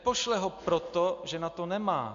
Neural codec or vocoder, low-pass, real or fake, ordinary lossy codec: none; 7.2 kHz; real; MP3, 48 kbps